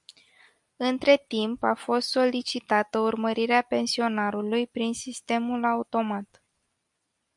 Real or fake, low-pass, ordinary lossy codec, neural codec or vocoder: real; 10.8 kHz; MP3, 96 kbps; none